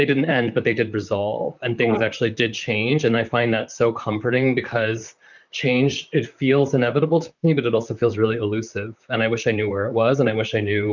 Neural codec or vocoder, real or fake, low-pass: vocoder, 44.1 kHz, 128 mel bands, Pupu-Vocoder; fake; 7.2 kHz